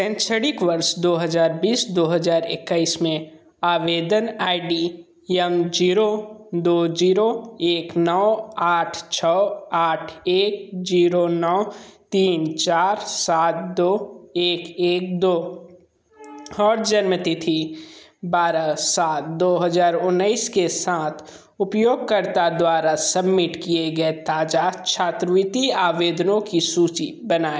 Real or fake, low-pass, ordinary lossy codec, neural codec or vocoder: real; none; none; none